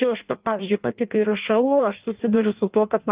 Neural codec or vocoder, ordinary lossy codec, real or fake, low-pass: codec, 16 kHz in and 24 kHz out, 0.6 kbps, FireRedTTS-2 codec; Opus, 32 kbps; fake; 3.6 kHz